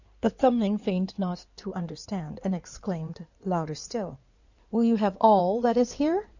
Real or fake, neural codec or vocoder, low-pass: fake; codec, 16 kHz in and 24 kHz out, 2.2 kbps, FireRedTTS-2 codec; 7.2 kHz